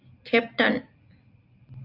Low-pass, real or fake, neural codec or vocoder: 5.4 kHz; real; none